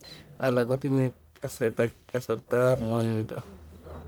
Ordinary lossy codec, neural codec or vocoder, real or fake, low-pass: none; codec, 44.1 kHz, 1.7 kbps, Pupu-Codec; fake; none